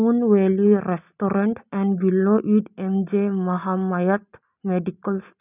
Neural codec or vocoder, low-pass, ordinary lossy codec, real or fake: none; 3.6 kHz; none; real